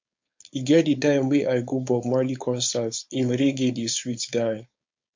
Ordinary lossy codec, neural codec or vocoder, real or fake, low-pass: MP3, 48 kbps; codec, 16 kHz, 4.8 kbps, FACodec; fake; 7.2 kHz